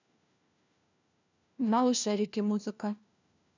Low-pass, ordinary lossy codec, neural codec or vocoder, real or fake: 7.2 kHz; none; codec, 16 kHz, 1 kbps, FunCodec, trained on LibriTTS, 50 frames a second; fake